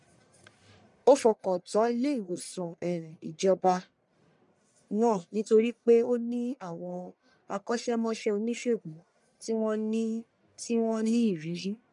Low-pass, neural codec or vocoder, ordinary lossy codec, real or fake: 10.8 kHz; codec, 44.1 kHz, 1.7 kbps, Pupu-Codec; none; fake